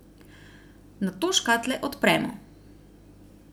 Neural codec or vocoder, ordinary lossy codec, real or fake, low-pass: none; none; real; none